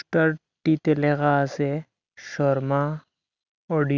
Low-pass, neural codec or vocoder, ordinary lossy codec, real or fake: 7.2 kHz; none; none; real